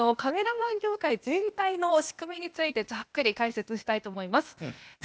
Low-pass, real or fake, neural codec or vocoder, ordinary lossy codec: none; fake; codec, 16 kHz, 0.8 kbps, ZipCodec; none